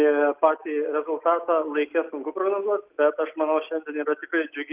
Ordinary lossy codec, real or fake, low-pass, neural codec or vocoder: Opus, 32 kbps; real; 3.6 kHz; none